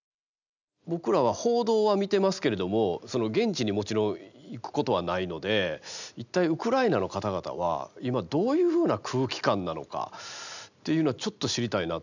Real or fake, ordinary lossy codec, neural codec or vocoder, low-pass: real; none; none; 7.2 kHz